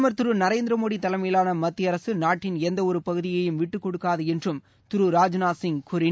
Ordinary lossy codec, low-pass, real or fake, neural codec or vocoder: none; none; real; none